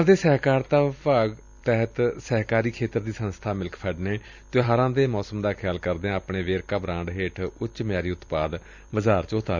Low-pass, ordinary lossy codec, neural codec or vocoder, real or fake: 7.2 kHz; none; vocoder, 44.1 kHz, 128 mel bands every 256 samples, BigVGAN v2; fake